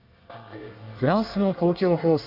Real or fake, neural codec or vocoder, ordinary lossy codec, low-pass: fake; codec, 24 kHz, 1 kbps, SNAC; none; 5.4 kHz